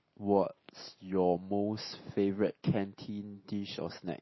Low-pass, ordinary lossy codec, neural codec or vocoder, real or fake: 7.2 kHz; MP3, 24 kbps; none; real